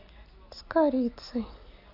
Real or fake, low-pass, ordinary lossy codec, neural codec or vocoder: real; 5.4 kHz; AAC, 32 kbps; none